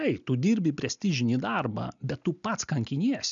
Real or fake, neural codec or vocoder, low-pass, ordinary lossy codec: real; none; 7.2 kHz; MP3, 96 kbps